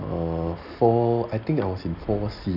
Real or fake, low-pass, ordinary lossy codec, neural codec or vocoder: real; 5.4 kHz; none; none